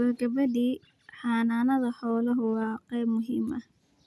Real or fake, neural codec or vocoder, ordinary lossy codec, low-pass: real; none; none; none